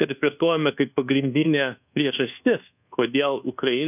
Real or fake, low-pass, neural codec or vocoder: fake; 3.6 kHz; codec, 24 kHz, 1.2 kbps, DualCodec